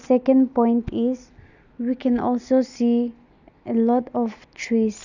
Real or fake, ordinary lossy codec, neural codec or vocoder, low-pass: real; none; none; 7.2 kHz